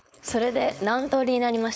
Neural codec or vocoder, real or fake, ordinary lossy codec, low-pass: codec, 16 kHz, 4.8 kbps, FACodec; fake; none; none